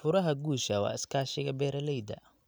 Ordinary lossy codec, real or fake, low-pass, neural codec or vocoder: none; real; none; none